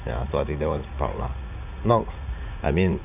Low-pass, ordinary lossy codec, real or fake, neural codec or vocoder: 3.6 kHz; none; fake; vocoder, 44.1 kHz, 80 mel bands, Vocos